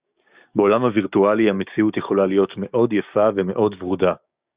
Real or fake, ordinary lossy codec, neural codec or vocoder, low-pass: fake; Opus, 64 kbps; codec, 16 kHz, 6 kbps, DAC; 3.6 kHz